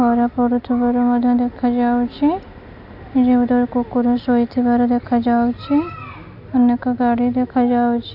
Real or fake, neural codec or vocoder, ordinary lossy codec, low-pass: real; none; none; 5.4 kHz